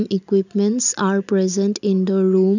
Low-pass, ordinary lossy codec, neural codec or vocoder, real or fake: 7.2 kHz; none; none; real